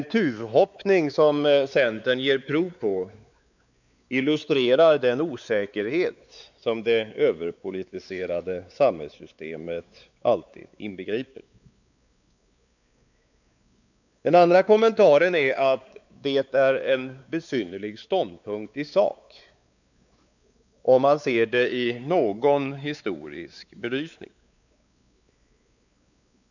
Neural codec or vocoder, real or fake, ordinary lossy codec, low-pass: codec, 16 kHz, 4 kbps, X-Codec, WavLM features, trained on Multilingual LibriSpeech; fake; none; 7.2 kHz